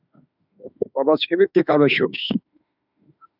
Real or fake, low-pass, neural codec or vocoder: fake; 5.4 kHz; autoencoder, 48 kHz, 32 numbers a frame, DAC-VAE, trained on Japanese speech